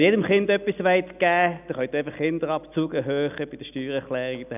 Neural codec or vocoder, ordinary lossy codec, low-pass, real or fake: none; none; 3.6 kHz; real